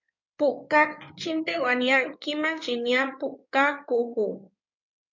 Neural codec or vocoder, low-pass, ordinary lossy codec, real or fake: codec, 16 kHz in and 24 kHz out, 2.2 kbps, FireRedTTS-2 codec; 7.2 kHz; MP3, 48 kbps; fake